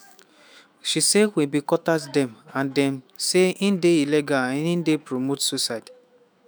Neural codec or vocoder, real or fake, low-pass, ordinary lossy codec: autoencoder, 48 kHz, 128 numbers a frame, DAC-VAE, trained on Japanese speech; fake; none; none